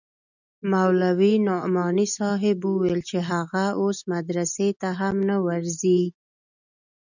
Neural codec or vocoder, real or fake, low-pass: none; real; 7.2 kHz